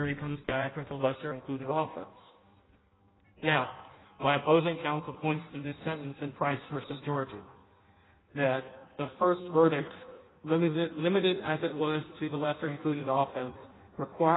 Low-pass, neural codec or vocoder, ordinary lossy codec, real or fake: 7.2 kHz; codec, 16 kHz in and 24 kHz out, 0.6 kbps, FireRedTTS-2 codec; AAC, 16 kbps; fake